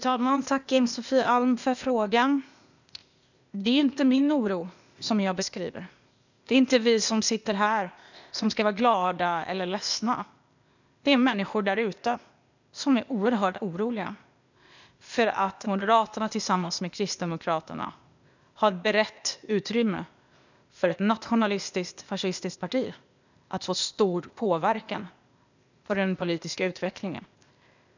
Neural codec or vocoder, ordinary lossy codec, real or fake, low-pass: codec, 16 kHz, 0.8 kbps, ZipCodec; none; fake; 7.2 kHz